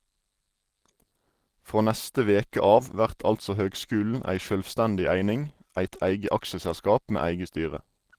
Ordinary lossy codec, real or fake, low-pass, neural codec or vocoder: Opus, 16 kbps; real; 14.4 kHz; none